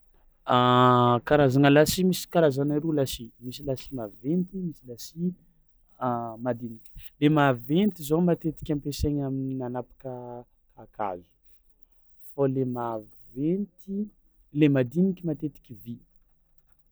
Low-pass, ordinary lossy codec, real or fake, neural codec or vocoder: none; none; real; none